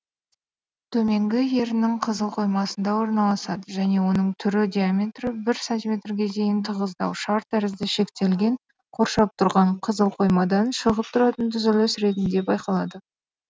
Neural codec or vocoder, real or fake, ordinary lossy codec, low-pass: none; real; none; none